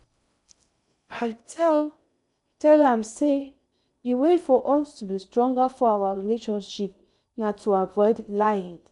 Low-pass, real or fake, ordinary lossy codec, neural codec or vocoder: 10.8 kHz; fake; none; codec, 16 kHz in and 24 kHz out, 0.6 kbps, FocalCodec, streaming, 2048 codes